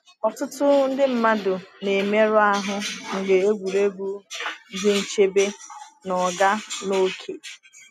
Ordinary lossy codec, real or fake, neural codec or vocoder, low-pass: none; real; none; 14.4 kHz